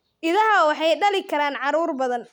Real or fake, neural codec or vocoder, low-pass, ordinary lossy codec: real; none; 19.8 kHz; none